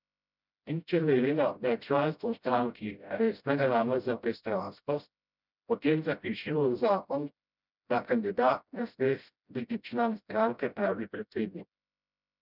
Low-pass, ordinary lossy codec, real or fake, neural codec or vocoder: 5.4 kHz; none; fake; codec, 16 kHz, 0.5 kbps, FreqCodec, smaller model